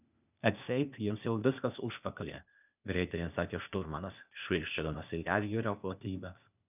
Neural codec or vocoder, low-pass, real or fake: codec, 16 kHz, 0.8 kbps, ZipCodec; 3.6 kHz; fake